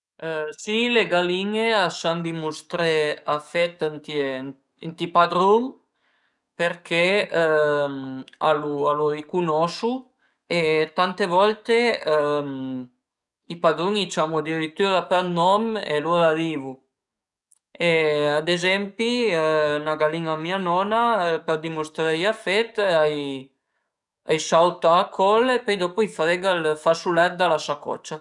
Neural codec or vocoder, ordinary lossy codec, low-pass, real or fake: codec, 44.1 kHz, 7.8 kbps, DAC; none; 10.8 kHz; fake